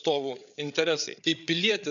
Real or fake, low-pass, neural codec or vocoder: fake; 7.2 kHz; codec, 16 kHz, 8 kbps, FreqCodec, larger model